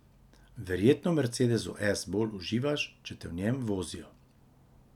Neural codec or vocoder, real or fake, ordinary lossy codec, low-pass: none; real; none; 19.8 kHz